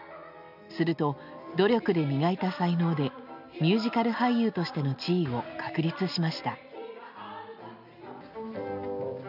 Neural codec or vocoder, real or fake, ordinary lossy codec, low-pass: none; real; none; 5.4 kHz